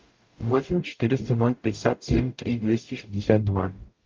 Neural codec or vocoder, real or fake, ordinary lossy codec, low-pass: codec, 44.1 kHz, 0.9 kbps, DAC; fake; Opus, 24 kbps; 7.2 kHz